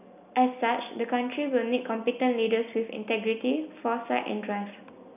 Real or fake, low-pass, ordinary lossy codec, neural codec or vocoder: real; 3.6 kHz; none; none